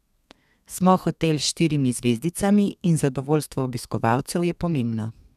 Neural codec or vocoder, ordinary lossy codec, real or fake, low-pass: codec, 32 kHz, 1.9 kbps, SNAC; none; fake; 14.4 kHz